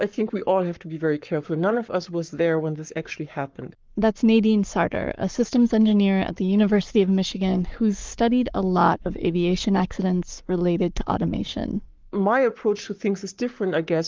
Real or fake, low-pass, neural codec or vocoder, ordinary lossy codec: fake; 7.2 kHz; codec, 44.1 kHz, 7.8 kbps, Pupu-Codec; Opus, 32 kbps